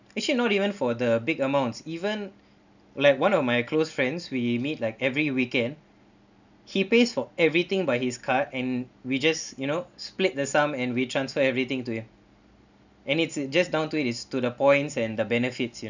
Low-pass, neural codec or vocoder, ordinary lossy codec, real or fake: 7.2 kHz; none; none; real